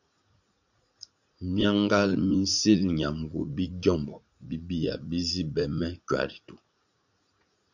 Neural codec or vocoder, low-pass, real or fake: vocoder, 44.1 kHz, 80 mel bands, Vocos; 7.2 kHz; fake